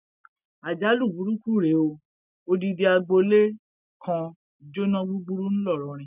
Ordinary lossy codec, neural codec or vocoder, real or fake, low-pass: none; none; real; 3.6 kHz